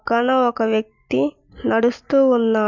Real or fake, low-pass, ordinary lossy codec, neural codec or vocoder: real; 7.2 kHz; none; none